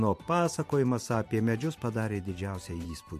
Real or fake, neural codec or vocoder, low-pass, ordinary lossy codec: fake; vocoder, 44.1 kHz, 128 mel bands every 512 samples, BigVGAN v2; 14.4 kHz; MP3, 64 kbps